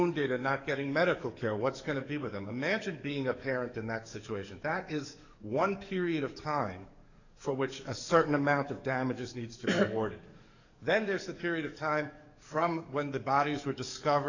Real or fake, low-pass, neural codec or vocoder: fake; 7.2 kHz; codec, 44.1 kHz, 7.8 kbps, DAC